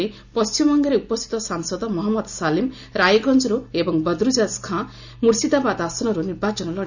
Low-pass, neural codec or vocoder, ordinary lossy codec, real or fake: 7.2 kHz; none; none; real